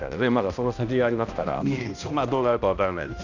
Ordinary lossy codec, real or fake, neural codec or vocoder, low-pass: none; fake; codec, 16 kHz, 1 kbps, X-Codec, HuBERT features, trained on balanced general audio; 7.2 kHz